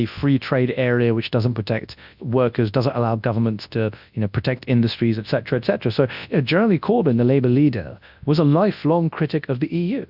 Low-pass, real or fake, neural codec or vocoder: 5.4 kHz; fake; codec, 24 kHz, 0.9 kbps, WavTokenizer, large speech release